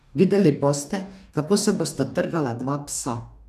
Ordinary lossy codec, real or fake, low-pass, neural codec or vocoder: none; fake; 14.4 kHz; codec, 44.1 kHz, 2.6 kbps, DAC